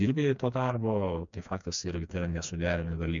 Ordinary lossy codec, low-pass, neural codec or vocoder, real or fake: MP3, 64 kbps; 7.2 kHz; codec, 16 kHz, 2 kbps, FreqCodec, smaller model; fake